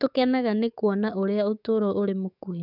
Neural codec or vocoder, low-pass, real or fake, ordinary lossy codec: codec, 44.1 kHz, 7.8 kbps, DAC; 5.4 kHz; fake; none